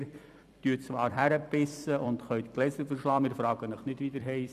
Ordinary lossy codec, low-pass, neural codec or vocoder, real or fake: none; 14.4 kHz; vocoder, 44.1 kHz, 128 mel bands every 256 samples, BigVGAN v2; fake